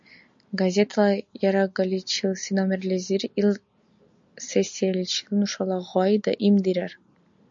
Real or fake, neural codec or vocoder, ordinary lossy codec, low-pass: real; none; MP3, 48 kbps; 7.2 kHz